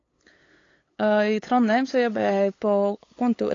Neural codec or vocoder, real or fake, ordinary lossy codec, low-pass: codec, 16 kHz, 8 kbps, FunCodec, trained on LibriTTS, 25 frames a second; fake; AAC, 32 kbps; 7.2 kHz